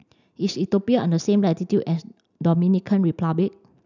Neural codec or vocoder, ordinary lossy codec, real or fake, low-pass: none; none; real; 7.2 kHz